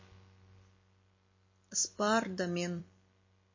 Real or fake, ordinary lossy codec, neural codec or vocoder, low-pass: fake; MP3, 32 kbps; vocoder, 44.1 kHz, 128 mel bands every 256 samples, BigVGAN v2; 7.2 kHz